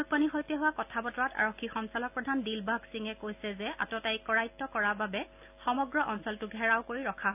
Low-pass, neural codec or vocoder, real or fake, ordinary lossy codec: 3.6 kHz; none; real; AAC, 32 kbps